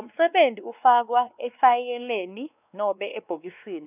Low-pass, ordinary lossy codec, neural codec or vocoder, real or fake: 3.6 kHz; none; codec, 16 kHz, 2 kbps, X-Codec, WavLM features, trained on Multilingual LibriSpeech; fake